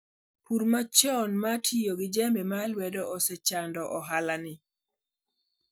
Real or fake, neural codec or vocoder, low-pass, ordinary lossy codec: real; none; none; none